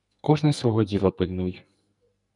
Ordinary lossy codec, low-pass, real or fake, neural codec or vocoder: MP3, 96 kbps; 10.8 kHz; fake; codec, 44.1 kHz, 2.6 kbps, SNAC